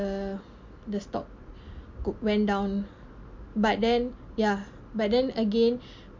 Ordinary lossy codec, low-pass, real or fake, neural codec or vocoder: MP3, 48 kbps; 7.2 kHz; real; none